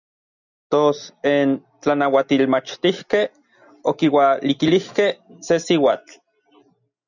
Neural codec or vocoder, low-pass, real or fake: none; 7.2 kHz; real